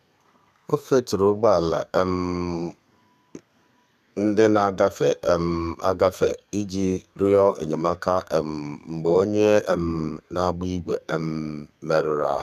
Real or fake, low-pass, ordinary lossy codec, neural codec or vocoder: fake; 14.4 kHz; none; codec, 32 kHz, 1.9 kbps, SNAC